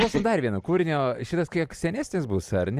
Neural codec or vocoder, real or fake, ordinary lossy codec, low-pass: none; real; Opus, 64 kbps; 14.4 kHz